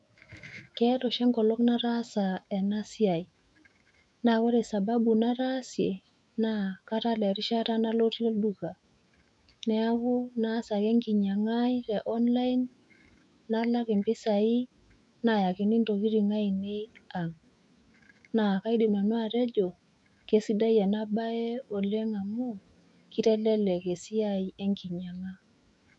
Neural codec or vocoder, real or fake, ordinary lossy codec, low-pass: autoencoder, 48 kHz, 128 numbers a frame, DAC-VAE, trained on Japanese speech; fake; none; 10.8 kHz